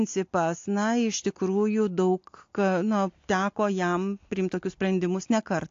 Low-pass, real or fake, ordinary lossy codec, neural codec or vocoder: 7.2 kHz; real; AAC, 48 kbps; none